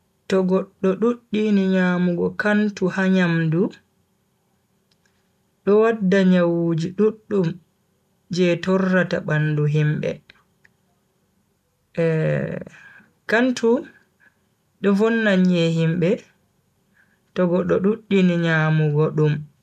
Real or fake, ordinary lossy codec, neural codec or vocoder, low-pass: real; none; none; 14.4 kHz